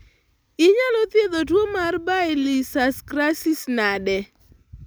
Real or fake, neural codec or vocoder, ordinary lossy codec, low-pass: real; none; none; none